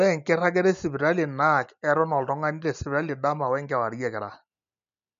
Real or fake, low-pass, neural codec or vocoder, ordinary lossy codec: real; 7.2 kHz; none; MP3, 64 kbps